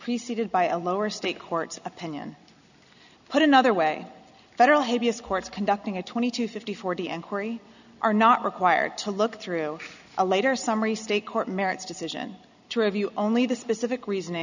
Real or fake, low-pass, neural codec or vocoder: real; 7.2 kHz; none